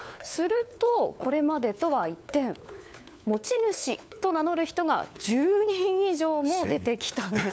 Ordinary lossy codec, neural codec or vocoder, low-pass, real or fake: none; codec, 16 kHz, 4 kbps, FunCodec, trained on LibriTTS, 50 frames a second; none; fake